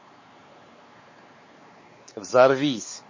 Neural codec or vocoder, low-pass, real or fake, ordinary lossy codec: codec, 16 kHz, 4 kbps, X-Codec, WavLM features, trained on Multilingual LibriSpeech; 7.2 kHz; fake; MP3, 32 kbps